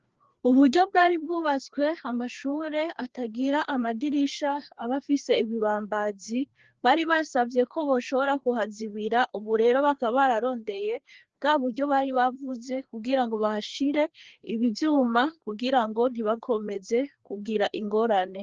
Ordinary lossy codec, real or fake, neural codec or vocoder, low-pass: Opus, 16 kbps; fake; codec, 16 kHz, 2 kbps, FreqCodec, larger model; 7.2 kHz